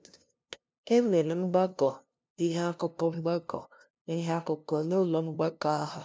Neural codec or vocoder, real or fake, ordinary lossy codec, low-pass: codec, 16 kHz, 0.5 kbps, FunCodec, trained on LibriTTS, 25 frames a second; fake; none; none